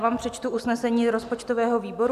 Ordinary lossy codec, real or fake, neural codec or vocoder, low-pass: MP3, 96 kbps; real; none; 14.4 kHz